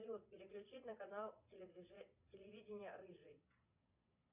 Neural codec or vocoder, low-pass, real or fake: vocoder, 22.05 kHz, 80 mel bands, Vocos; 3.6 kHz; fake